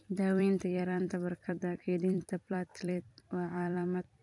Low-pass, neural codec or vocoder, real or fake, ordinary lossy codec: 10.8 kHz; vocoder, 44.1 kHz, 128 mel bands every 256 samples, BigVGAN v2; fake; none